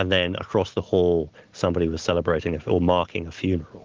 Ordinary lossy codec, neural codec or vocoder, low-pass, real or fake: Opus, 16 kbps; none; 7.2 kHz; real